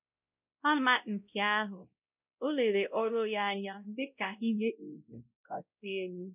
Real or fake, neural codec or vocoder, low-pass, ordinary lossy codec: fake; codec, 16 kHz, 0.5 kbps, X-Codec, WavLM features, trained on Multilingual LibriSpeech; 3.6 kHz; none